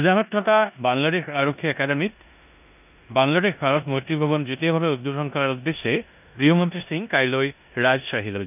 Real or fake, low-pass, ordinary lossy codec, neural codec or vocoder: fake; 3.6 kHz; none; codec, 16 kHz in and 24 kHz out, 0.9 kbps, LongCat-Audio-Codec, four codebook decoder